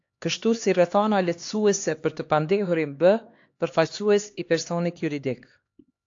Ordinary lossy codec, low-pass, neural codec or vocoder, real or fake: AAC, 48 kbps; 7.2 kHz; codec, 16 kHz, 2 kbps, X-Codec, HuBERT features, trained on LibriSpeech; fake